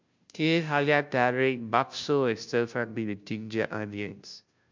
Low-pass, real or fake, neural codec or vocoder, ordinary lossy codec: 7.2 kHz; fake; codec, 16 kHz, 0.5 kbps, FunCodec, trained on Chinese and English, 25 frames a second; MP3, 64 kbps